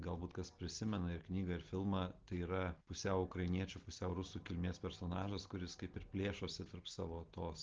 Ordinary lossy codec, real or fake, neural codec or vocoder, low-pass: Opus, 16 kbps; real; none; 7.2 kHz